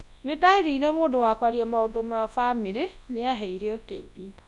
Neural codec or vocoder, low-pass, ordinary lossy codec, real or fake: codec, 24 kHz, 0.9 kbps, WavTokenizer, large speech release; 10.8 kHz; none; fake